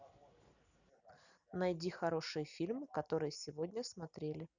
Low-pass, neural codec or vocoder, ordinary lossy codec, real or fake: 7.2 kHz; none; none; real